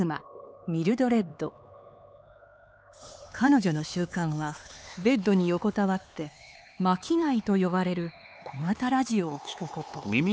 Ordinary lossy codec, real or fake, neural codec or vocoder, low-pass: none; fake; codec, 16 kHz, 4 kbps, X-Codec, HuBERT features, trained on LibriSpeech; none